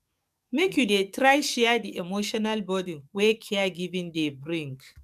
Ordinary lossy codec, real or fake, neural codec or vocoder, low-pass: MP3, 96 kbps; fake; codec, 44.1 kHz, 7.8 kbps, DAC; 14.4 kHz